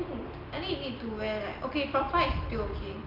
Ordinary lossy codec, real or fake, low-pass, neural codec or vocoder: Opus, 32 kbps; real; 5.4 kHz; none